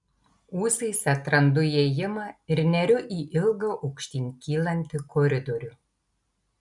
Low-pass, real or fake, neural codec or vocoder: 10.8 kHz; real; none